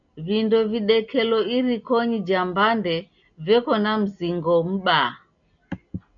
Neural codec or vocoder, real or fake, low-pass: none; real; 7.2 kHz